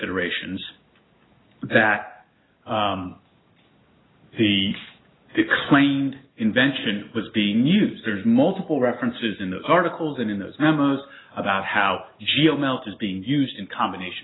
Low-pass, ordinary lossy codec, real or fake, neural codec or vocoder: 7.2 kHz; AAC, 16 kbps; real; none